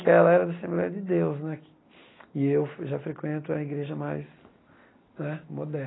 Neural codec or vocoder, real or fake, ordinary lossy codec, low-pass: none; real; AAC, 16 kbps; 7.2 kHz